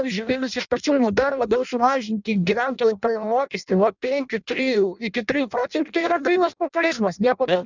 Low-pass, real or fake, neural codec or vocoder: 7.2 kHz; fake; codec, 16 kHz in and 24 kHz out, 0.6 kbps, FireRedTTS-2 codec